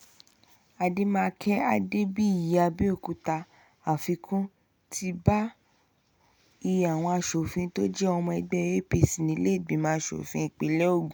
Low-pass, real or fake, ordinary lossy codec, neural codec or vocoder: none; real; none; none